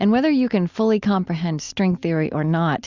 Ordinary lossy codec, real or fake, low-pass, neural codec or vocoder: Opus, 64 kbps; real; 7.2 kHz; none